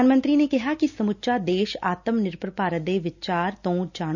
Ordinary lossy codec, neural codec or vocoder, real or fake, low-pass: none; none; real; 7.2 kHz